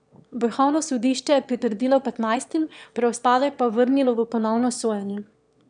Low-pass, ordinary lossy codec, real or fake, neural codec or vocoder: 9.9 kHz; none; fake; autoencoder, 22.05 kHz, a latent of 192 numbers a frame, VITS, trained on one speaker